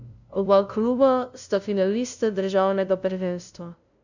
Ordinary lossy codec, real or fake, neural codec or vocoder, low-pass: none; fake; codec, 16 kHz, 0.5 kbps, FunCodec, trained on LibriTTS, 25 frames a second; 7.2 kHz